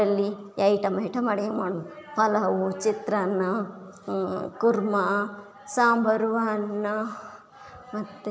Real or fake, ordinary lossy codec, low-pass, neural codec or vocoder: real; none; none; none